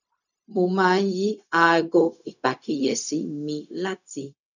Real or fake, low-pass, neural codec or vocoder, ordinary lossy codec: fake; 7.2 kHz; codec, 16 kHz, 0.4 kbps, LongCat-Audio-Codec; none